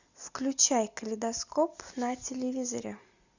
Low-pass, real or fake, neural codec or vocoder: 7.2 kHz; real; none